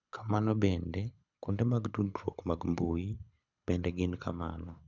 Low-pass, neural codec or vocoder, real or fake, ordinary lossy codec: 7.2 kHz; codec, 24 kHz, 6 kbps, HILCodec; fake; none